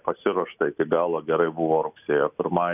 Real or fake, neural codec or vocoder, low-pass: real; none; 3.6 kHz